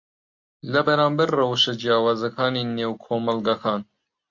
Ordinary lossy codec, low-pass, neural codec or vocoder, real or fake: MP3, 48 kbps; 7.2 kHz; none; real